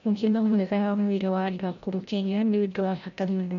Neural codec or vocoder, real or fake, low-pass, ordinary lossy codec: codec, 16 kHz, 0.5 kbps, FreqCodec, larger model; fake; 7.2 kHz; none